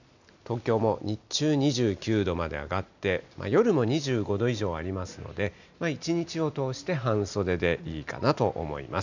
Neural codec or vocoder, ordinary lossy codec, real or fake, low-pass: none; none; real; 7.2 kHz